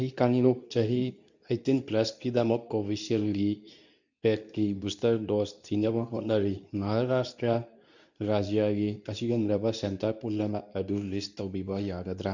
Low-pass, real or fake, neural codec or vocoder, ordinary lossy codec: 7.2 kHz; fake; codec, 24 kHz, 0.9 kbps, WavTokenizer, medium speech release version 2; none